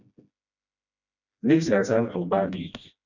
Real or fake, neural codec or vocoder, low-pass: fake; codec, 16 kHz, 1 kbps, FreqCodec, smaller model; 7.2 kHz